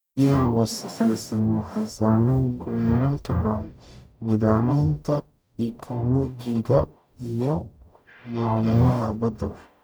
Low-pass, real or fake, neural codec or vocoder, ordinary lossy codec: none; fake; codec, 44.1 kHz, 0.9 kbps, DAC; none